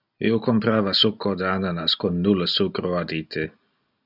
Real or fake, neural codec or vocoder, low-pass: real; none; 5.4 kHz